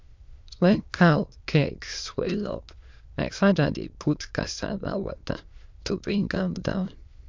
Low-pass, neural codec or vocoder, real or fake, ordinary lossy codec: 7.2 kHz; autoencoder, 22.05 kHz, a latent of 192 numbers a frame, VITS, trained on many speakers; fake; MP3, 64 kbps